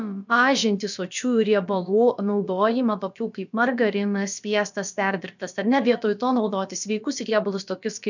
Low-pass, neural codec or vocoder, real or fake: 7.2 kHz; codec, 16 kHz, about 1 kbps, DyCAST, with the encoder's durations; fake